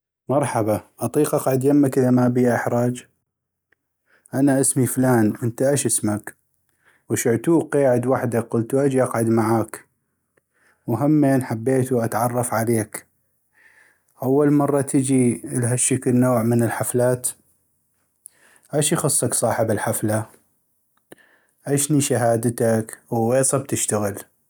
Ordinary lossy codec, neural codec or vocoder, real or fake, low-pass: none; none; real; none